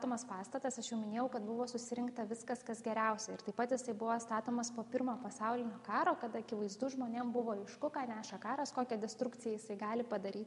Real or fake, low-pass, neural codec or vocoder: real; 10.8 kHz; none